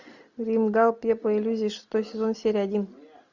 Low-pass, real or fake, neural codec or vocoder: 7.2 kHz; real; none